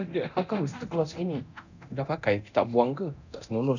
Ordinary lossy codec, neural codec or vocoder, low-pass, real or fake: none; codec, 24 kHz, 0.9 kbps, DualCodec; 7.2 kHz; fake